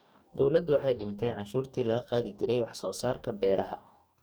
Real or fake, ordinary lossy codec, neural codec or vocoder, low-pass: fake; none; codec, 44.1 kHz, 2.6 kbps, DAC; none